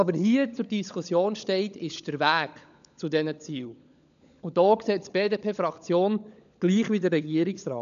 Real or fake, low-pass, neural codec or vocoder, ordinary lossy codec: fake; 7.2 kHz; codec, 16 kHz, 16 kbps, FunCodec, trained on LibriTTS, 50 frames a second; none